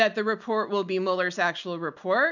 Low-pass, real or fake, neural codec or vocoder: 7.2 kHz; real; none